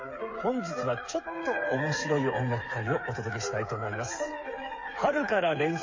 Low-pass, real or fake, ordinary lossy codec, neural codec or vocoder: 7.2 kHz; fake; MP3, 32 kbps; codec, 16 kHz, 8 kbps, FreqCodec, smaller model